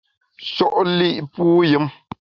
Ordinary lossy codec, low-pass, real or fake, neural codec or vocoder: Opus, 64 kbps; 7.2 kHz; real; none